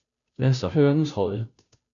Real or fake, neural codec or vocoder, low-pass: fake; codec, 16 kHz, 0.5 kbps, FunCodec, trained on Chinese and English, 25 frames a second; 7.2 kHz